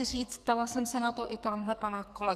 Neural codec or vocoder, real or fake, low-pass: codec, 44.1 kHz, 2.6 kbps, SNAC; fake; 14.4 kHz